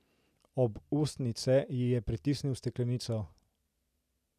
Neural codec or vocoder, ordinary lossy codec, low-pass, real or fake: none; none; 14.4 kHz; real